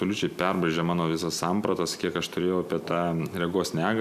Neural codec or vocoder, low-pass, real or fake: none; 14.4 kHz; real